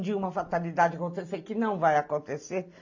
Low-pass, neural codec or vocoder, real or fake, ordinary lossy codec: 7.2 kHz; none; real; AAC, 48 kbps